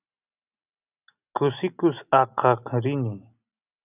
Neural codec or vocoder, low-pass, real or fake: none; 3.6 kHz; real